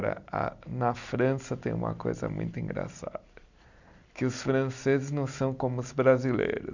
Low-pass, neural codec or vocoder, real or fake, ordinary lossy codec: 7.2 kHz; none; real; none